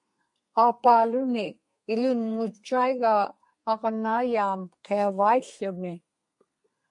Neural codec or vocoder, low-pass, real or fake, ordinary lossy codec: codec, 32 kHz, 1.9 kbps, SNAC; 10.8 kHz; fake; MP3, 48 kbps